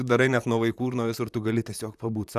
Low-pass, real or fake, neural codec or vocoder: 14.4 kHz; fake; vocoder, 44.1 kHz, 128 mel bands, Pupu-Vocoder